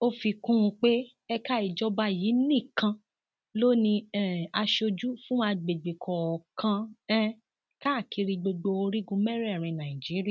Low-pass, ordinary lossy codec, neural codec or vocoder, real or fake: none; none; none; real